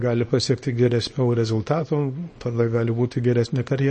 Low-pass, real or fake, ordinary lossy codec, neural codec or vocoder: 10.8 kHz; fake; MP3, 32 kbps; codec, 24 kHz, 0.9 kbps, WavTokenizer, small release